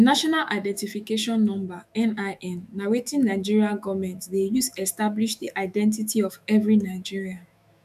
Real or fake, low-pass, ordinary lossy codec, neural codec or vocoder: fake; 14.4 kHz; none; autoencoder, 48 kHz, 128 numbers a frame, DAC-VAE, trained on Japanese speech